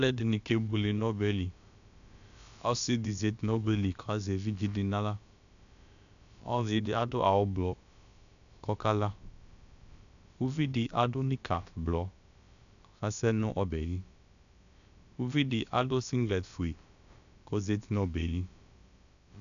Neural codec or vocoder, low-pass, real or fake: codec, 16 kHz, about 1 kbps, DyCAST, with the encoder's durations; 7.2 kHz; fake